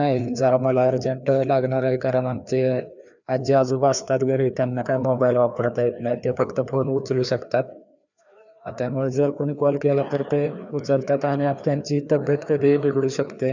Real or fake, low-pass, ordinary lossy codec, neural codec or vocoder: fake; 7.2 kHz; none; codec, 16 kHz, 2 kbps, FreqCodec, larger model